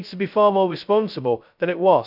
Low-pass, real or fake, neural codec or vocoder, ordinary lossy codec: 5.4 kHz; fake; codec, 16 kHz, 0.2 kbps, FocalCodec; none